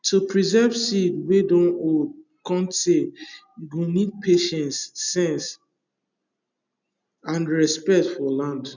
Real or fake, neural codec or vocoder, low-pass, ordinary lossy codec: real; none; 7.2 kHz; none